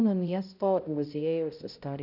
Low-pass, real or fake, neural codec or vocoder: 5.4 kHz; fake; codec, 16 kHz, 0.5 kbps, X-Codec, HuBERT features, trained on balanced general audio